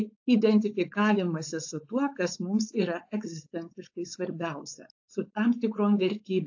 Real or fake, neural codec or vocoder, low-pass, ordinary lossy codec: fake; codec, 16 kHz, 4.8 kbps, FACodec; 7.2 kHz; AAC, 48 kbps